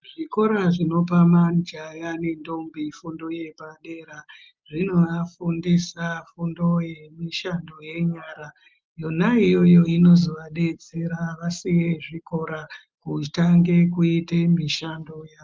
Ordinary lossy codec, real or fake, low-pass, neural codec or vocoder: Opus, 24 kbps; real; 7.2 kHz; none